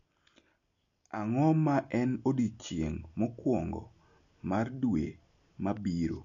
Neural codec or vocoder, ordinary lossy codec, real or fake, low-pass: none; none; real; 7.2 kHz